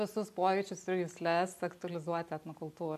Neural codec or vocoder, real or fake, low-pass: none; real; 14.4 kHz